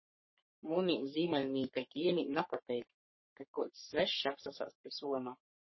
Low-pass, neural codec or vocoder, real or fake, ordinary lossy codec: 7.2 kHz; codec, 44.1 kHz, 3.4 kbps, Pupu-Codec; fake; MP3, 24 kbps